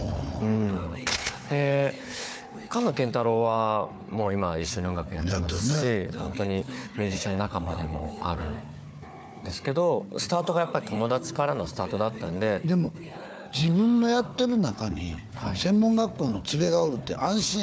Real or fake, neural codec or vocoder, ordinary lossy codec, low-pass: fake; codec, 16 kHz, 4 kbps, FunCodec, trained on Chinese and English, 50 frames a second; none; none